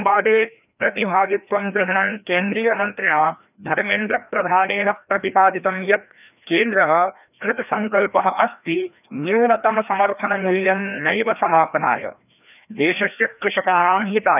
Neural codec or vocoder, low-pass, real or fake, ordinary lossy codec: codec, 16 kHz, 1 kbps, FreqCodec, larger model; 3.6 kHz; fake; none